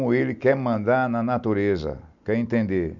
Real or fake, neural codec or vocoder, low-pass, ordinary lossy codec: real; none; 7.2 kHz; none